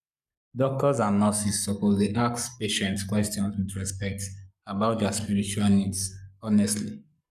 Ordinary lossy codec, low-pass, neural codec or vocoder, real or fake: none; 14.4 kHz; codec, 44.1 kHz, 7.8 kbps, Pupu-Codec; fake